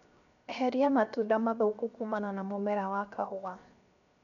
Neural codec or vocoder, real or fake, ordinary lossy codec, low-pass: codec, 16 kHz, 0.7 kbps, FocalCodec; fake; none; 7.2 kHz